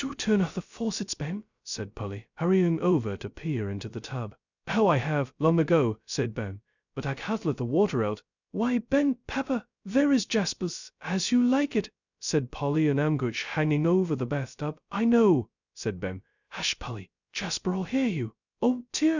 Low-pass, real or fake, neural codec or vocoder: 7.2 kHz; fake; codec, 16 kHz, 0.2 kbps, FocalCodec